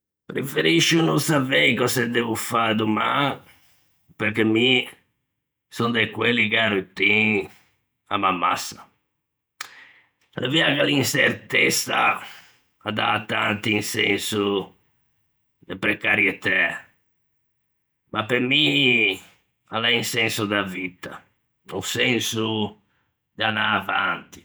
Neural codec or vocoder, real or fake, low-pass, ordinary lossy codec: vocoder, 48 kHz, 128 mel bands, Vocos; fake; none; none